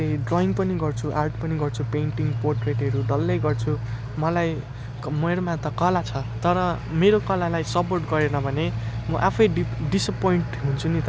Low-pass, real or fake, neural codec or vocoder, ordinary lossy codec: none; real; none; none